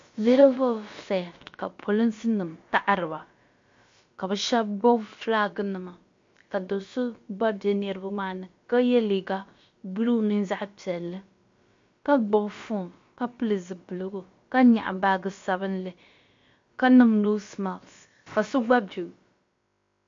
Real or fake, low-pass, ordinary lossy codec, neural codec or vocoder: fake; 7.2 kHz; MP3, 48 kbps; codec, 16 kHz, about 1 kbps, DyCAST, with the encoder's durations